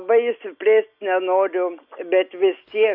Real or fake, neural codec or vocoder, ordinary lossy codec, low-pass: real; none; MP3, 32 kbps; 5.4 kHz